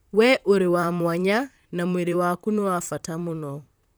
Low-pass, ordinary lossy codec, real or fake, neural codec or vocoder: none; none; fake; vocoder, 44.1 kHz, 128 mel bands, Pupu-Vocoder